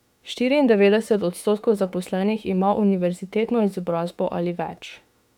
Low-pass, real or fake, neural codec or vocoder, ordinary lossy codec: 19.8 kHz; fake; autoencoder, 48 kHz, 32 numbers a frame, DAC-VAE, trained on Japanese speech; none